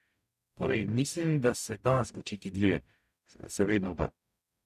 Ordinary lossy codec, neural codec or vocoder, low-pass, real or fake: none; codec, 44.1 kHz, 0.9 kbps, DAC; 14.4 kHz; fake